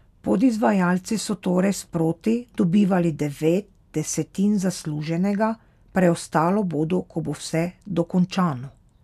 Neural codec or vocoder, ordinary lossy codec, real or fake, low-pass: none; none; real; 14.4 kHz